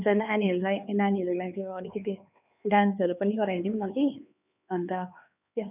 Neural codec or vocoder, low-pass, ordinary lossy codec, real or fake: codec, 16 kHz, 4 kbps, X-Codec, HuBERT features, trained on LibriSpeech; 3.6 kHz; none; fake